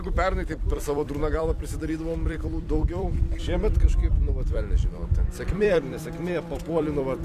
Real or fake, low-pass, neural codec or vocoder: fake; 14.4 kHz; vocoder, 44.1 kHz, 128 mel bands every 256 samples, BigVGAN v2